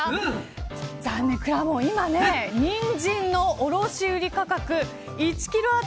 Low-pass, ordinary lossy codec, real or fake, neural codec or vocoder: none; none; real; none